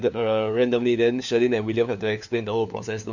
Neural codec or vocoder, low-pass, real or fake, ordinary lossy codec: codec, 16 kHz, 4 kbps, FunCodec, trained on LibriTTS, 50 frames a second; 7.2 kHz; fake; none